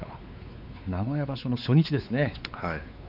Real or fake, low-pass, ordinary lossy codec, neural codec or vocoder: fake; 5.4 kHz; none; codec, 16 kHz, 4 kbps, X-Codec, WavLM features, trained on Multilingual LibriSpeech